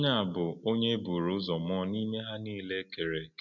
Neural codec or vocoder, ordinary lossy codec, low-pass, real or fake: none; none; 7.2 kHz; real